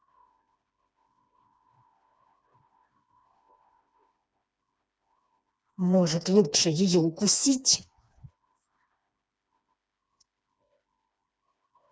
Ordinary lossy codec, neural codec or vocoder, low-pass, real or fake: none; codec, 16 kHz, 2 kbps, FreqCodec, smaller model; none; fake